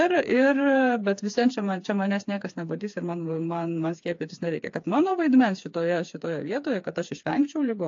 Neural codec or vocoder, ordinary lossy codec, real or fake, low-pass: codec, 16 kHz, 4 kbps, FreqCodec, smaller model; AAC, 48 kbps; fake; 7.2 kHz